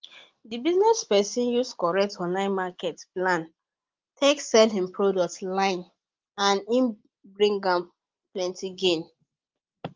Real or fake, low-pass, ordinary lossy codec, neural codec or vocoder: real; 7.2 kHz; Opus, 32 kbps; none